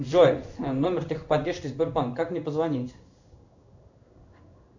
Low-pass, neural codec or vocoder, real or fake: 7.2 kHz; codec, 16 kHz in and 24 kHz out, 1 kbps, XY-Tokenizer; fake